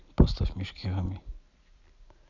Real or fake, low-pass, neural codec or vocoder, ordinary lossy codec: real; 7.2 kHz; none; none